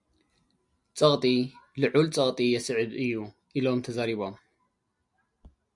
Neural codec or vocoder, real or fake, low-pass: none; real; 10.8 kHz